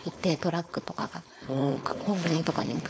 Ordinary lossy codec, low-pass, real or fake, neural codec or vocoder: none; none; fake; codec, 16 kHz, 4.8 kbps, FACodec